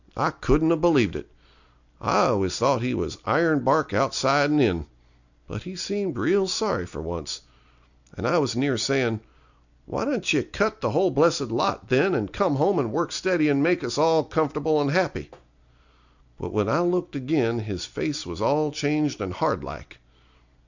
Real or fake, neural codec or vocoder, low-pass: real; none; 7.2 kHz